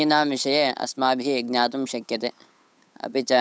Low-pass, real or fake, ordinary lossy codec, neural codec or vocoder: none; fake; none; codec, 16 kHz, 8 kbps, FunCodec, trained on Chinese and English, 25 frames a second